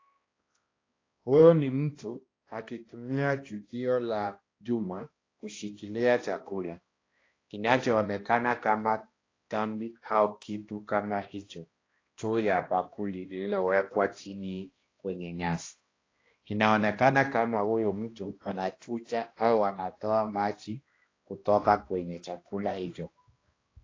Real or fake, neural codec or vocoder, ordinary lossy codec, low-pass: fake; codec, 16 kHz, 1 kbps, X-Codec, HuBERT features, trained on balanced general audio; AAC, 32 kbps; 7.2 kHz